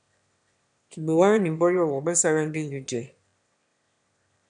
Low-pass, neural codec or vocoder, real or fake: 9.9 kHz; autoencoder, 22.05 kHz, a latent of 192 numbers a frame, VITS, trained on one speaker; fake